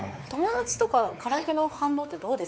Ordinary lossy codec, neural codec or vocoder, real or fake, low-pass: none; codec, 16 kHz, 4 kbps, X-Codec, WavLM features, trained on Multilingual LibriSpeech; fake; none